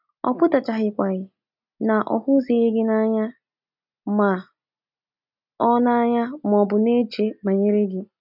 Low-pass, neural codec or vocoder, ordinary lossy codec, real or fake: 5.4 kHz; none; none; real